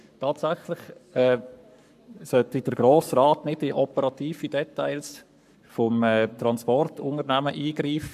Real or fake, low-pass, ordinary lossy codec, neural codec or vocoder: fake; 14.4 kHz; AAC, 96 kbps; codec, 44.1 kHz, 7.8 kbps, Pupu-Codec